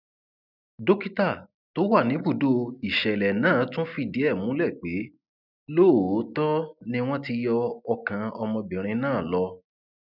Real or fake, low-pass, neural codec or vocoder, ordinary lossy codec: real; 5.4 kHz; none; none